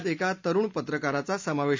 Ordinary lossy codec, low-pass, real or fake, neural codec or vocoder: MP3, 64 kbps; 7.2 kHz; real; none